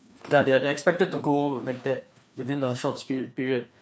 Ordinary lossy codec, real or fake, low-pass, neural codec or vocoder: none; fake; none; codec, 16 kHz, 2 kbps, FreqCodec, larger model